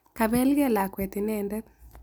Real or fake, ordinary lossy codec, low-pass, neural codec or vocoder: real; none; none; none